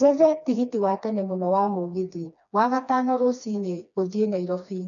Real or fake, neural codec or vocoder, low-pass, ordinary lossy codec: fake; codec, 16 kHz, 2 kbps, FreqCodec, smaller model; 7.2 kHz; none